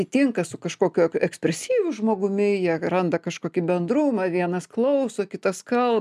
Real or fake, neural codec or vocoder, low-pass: real; none; 14.4 kHz